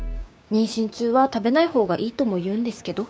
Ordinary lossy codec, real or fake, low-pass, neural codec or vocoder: none; fake; none; codec, 16 kHz, 6 kbps, DAC